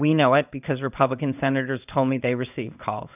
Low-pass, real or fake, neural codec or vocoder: 3.6 kHz; fake; codec, 16 kHz in and 24 kHz out, 1 kbps, XY-Tokenizer